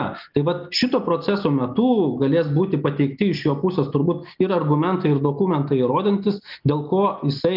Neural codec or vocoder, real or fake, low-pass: none; real; 5.4 kHz